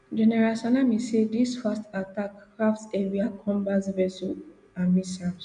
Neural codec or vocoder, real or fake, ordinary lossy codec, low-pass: none; real; none; 9.9 kHz